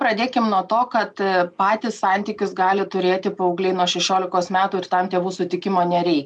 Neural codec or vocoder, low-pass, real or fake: none; 10.8 kHz; real